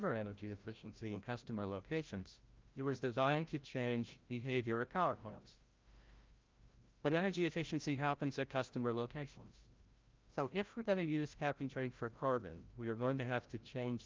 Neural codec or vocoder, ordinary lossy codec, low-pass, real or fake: codec, 16 kHz, 0.5 kbps, FreqCodec, larger model; Opus, 24 kbps; 7.2 kHz; fake